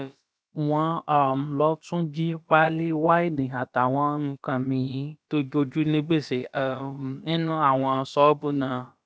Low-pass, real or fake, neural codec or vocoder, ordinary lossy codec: none; fake; codec, 16 kHz, about 1 kbps, DyCAST, with the encoder's durations; none